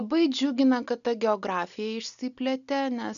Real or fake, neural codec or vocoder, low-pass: real; none; 7.2 kHz